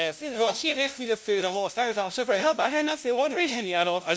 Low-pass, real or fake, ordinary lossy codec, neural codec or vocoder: none; fake; none; codec, 16 kHz, 0.5 kbps, FunCodec, trained on LibriTTS, 25 frames a second